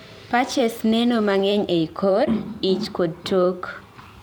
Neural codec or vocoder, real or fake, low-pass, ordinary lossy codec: vocoder, 44.1 kHz, 128 mel bands every 512 samples, BigVGAN v2; fake; none; none